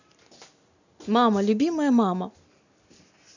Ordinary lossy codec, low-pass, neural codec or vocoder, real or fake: none; 7.2 kHz; none; real